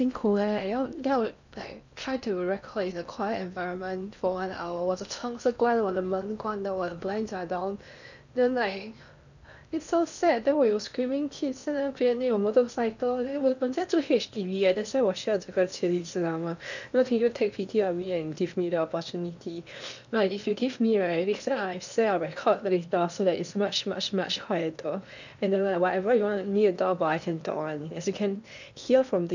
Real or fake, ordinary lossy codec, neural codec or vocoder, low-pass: fake; none; codec, 16 kHz in and 24 kHz out, 0.8 kbps, FocalCodec, streaming, 65536 codes; 7.2 kHz